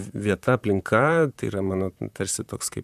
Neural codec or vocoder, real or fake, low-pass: vocoder, 44.1 kHz, 128 mel bands, Pupu-Vocoder; fake; 14.4 kHz